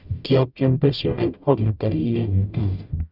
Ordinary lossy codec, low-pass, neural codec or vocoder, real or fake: none; 5.4 kHz; codec, 44.1 kHz, 0.9 kbps, DAC; fake